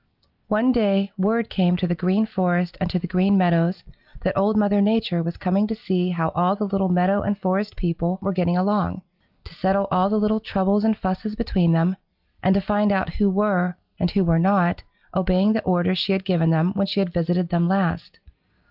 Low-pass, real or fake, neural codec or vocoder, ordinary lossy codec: 5.4 kHz; real; none; Opus, 32 kbps